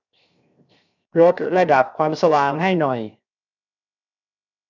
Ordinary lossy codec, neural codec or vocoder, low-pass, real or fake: none; codec, 16 kHz, 0.7 kbps, FocalCodec; 7.2 kHz; fake